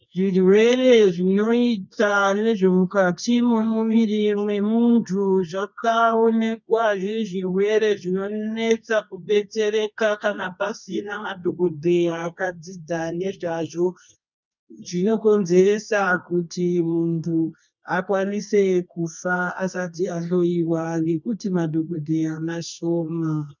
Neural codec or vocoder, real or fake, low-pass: codec, 24 kHz, 0.9 kbps, WavTokenizer, medium music audio release; fake; 7.2 kHz